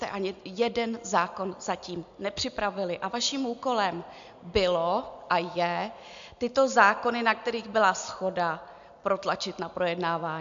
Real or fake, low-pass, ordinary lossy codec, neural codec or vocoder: real; 7.2 kHz; MP3, 64 kbps; none